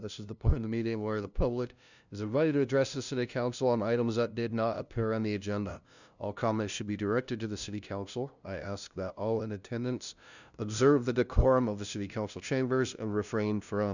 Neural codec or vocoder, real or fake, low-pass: codec, 16 kHz, 0.5 kbps, FunCodec, trained on LibriTTS, 25 frames a second; fake; 7.2 kHz